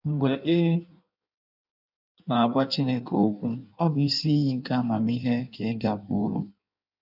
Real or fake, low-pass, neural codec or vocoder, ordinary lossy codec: fake; 5.4 kHz; codec, 16 kHz in and 24 kHz out, 1.1 kbps, FireRedTTS-2 codec; none